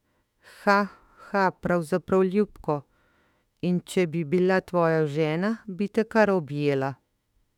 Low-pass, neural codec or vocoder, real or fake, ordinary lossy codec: 19.8 kHz; autoencoder, 48 kHz, 32 numbers a frame, DAC-VAE, trained on Japanese speech; fake; none